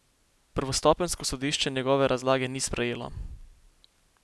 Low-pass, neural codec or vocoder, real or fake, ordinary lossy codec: none; none; real; none